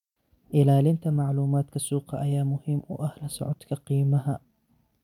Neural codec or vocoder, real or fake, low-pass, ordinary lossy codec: vocoder, 44.1 kHz, 128 mel bands every 256 samples, BigVGAN v2; fake; 19.8 kHz; none